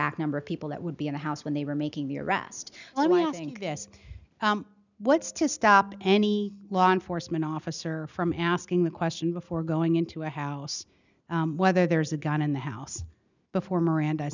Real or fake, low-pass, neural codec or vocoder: real; 7.2 kHz; none